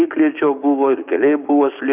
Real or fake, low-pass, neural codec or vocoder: fake; 3.6 kHz; codec, 16 kHz, 6 kbps, DAC